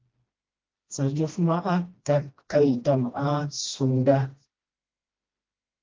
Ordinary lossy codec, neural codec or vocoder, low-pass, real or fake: Opus, 24 kbps; codec, 16 kHz, 1 kbps, FreqCodec, smaller model; 7.2 kHz; fake